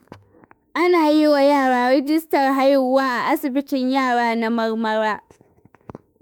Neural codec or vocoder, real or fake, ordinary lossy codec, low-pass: autoencoder, 48 kHz, 32 numbers a frame, DAC-VAE, trained on Japanese speech; fake; none; none